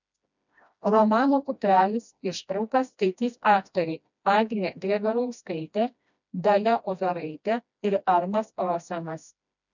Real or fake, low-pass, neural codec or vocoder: fake; 7.2 kHz; codec, 16 kHz, 1 kbps, FreqCodec, smaller model